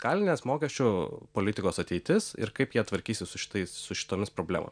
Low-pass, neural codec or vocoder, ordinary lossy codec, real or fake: 9.9 kHz; none; MP3, 96 kbps; real